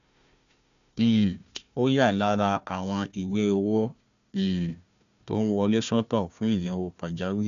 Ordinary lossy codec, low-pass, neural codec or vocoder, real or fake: AAC, 96 kbps; 7.2 kHz; codec, 16 kHz, 1 kbps, FunCodec, trained on Chinese and English, 50 frames a second; fake